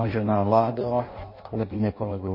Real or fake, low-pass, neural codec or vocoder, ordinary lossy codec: fake; 5.4 kHz; codec, 16 kHz in and 24 kHz out, 0.6 kbps, FireRedTTS-2 codec; MP3, 24 kbps